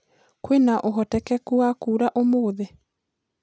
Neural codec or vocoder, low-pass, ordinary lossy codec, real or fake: none; none; none; real